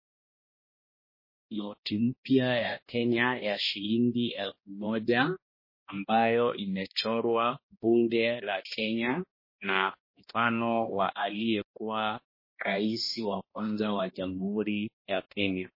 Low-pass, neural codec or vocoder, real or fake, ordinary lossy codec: 5.4 kHz; codec, 16 kHz, 1 kbps, X-Codec, HuBERT features, trained on balanced general audio; fake; MP3, 24 kbps